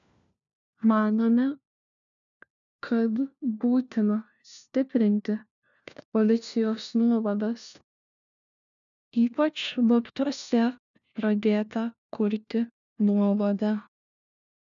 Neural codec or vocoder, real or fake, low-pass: codec, 16 kHz, 1 kbps, FunCodec, trained on LibriTTS, 50 frames a second; fake; 7.2 kHz